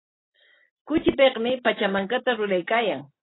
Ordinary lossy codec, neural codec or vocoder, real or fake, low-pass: AAC, 16 kbps; none; real; 7.2 kHz